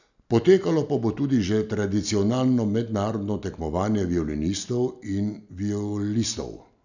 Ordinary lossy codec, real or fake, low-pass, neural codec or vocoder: none; real; 7.2 kHz; none